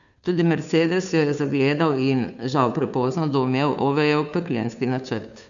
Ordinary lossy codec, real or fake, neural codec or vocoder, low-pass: none; fake; codec, 16 kHz, 2 kbps, FunCodec, trained on Chinese and English, 25 frames a second; 7.2 kHz